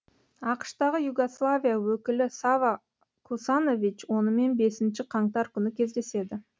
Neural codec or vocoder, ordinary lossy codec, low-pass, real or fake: none; none; none; real